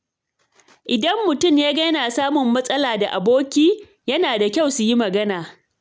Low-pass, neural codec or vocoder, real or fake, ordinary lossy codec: none; none; real; none